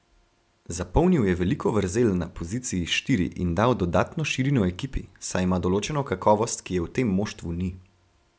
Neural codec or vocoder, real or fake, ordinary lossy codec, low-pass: none; real; none; none